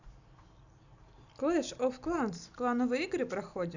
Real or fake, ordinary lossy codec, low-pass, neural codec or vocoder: real; none; 7.2 kHz; none